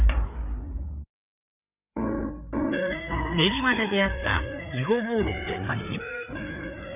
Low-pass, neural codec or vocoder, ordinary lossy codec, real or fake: 3.6 kHz; codec, 16 kHz, 4 kbps, FreqCodec, larger model; none; fake